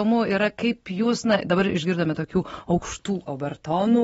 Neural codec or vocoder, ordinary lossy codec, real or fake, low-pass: none; AAC, 24 kbps; real; 19.8 kHz